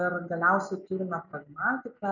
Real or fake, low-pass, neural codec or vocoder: real; 7.2 kHz; none